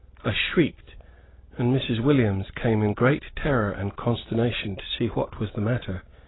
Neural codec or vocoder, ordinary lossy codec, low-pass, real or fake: none; AAC, 16 kbps; 7.2 kHz; real